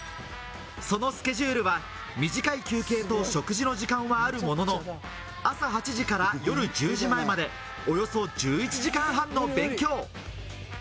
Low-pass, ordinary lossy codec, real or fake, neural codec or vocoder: none; none; real; none